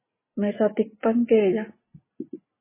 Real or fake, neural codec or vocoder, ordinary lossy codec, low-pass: real; none; MP3, 16 kbps; 3.6 kHz